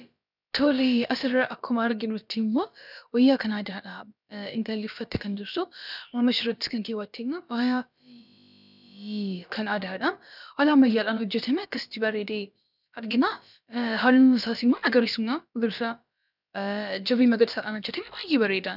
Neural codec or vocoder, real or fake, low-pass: codec, 16 kHz, about 1 kbps, DyCAST, with the encoder's durations; fake; 5.4 kHz